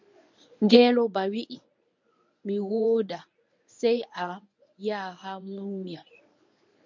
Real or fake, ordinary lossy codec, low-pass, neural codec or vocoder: fake; MP3, 64 kbps; 7.2 kHz; codec, 24 kHz, 0.9 kbps, WavTokenizer, medium speech release version 1